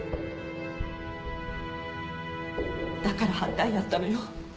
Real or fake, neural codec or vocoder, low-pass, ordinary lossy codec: real; none; none; none